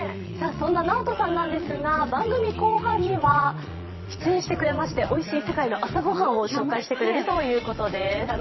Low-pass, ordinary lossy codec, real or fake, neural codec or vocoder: 7.2 kHz; MP3, 24 kbps; fake; vocoder, 22.05 kHz, 80 mel bands, Vocos